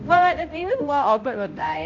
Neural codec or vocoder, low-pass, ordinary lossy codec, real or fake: codec, 16 kHz, 0.5 kbps, X-Codec, HuBERT features, trained on balanced general audio; 7.2 kHz; AAC, 48 kbps; fake